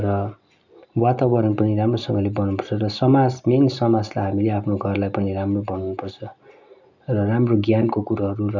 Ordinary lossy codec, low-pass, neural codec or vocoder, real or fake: none; 7.2 kHz; none; real